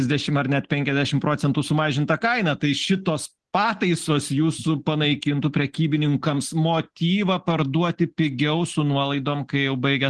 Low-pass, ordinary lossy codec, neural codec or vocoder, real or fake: 10.8 kHz; Opus, 16 kbps; none; real